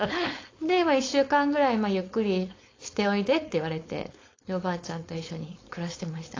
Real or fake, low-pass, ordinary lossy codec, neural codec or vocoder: fake; 7.2 kHz; AAC, 32 kbps; codec, 16 kHz, 4.8 kbps, FACodec